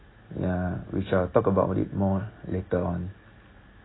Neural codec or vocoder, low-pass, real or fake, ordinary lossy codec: none; 7.2 kHz; real; AAC, 16 kbps